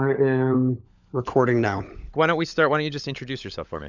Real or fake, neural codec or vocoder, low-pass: fake; codec, 16 kHz, 16 kbps, FunCodec, trained on LibriTTS, 50 frames a second; 7.2 kHz